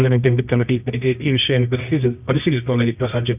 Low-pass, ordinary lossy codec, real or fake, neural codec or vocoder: 3.6 kHz; none; fake; codec, 24 kHz, 0.9 kbps, WavTokenizer, medium music audio release